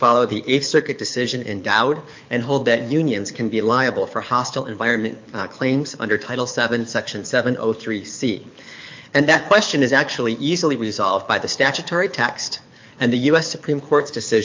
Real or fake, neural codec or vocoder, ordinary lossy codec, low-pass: fake; codec, 24 kHz, 6 kbps, HILCodec; MP3, 48 kbps; 7.2 kHz